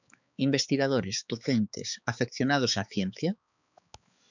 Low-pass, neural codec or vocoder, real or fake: 7.2 kHz; codec, 16 kHz, 4 kbps, X-Codec, HuBERT features, trained on balanced general audio; fake